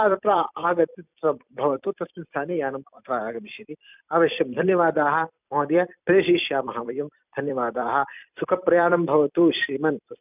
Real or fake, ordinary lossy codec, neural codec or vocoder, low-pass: fake; none; vocoder, 44.1 kHz, 128 mel bands every 256 samples, BigVGAN v2; 3.6 kHz